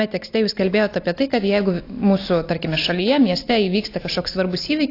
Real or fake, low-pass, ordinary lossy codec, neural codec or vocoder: real; 5.4 kHz; AAC, 32 kbps; none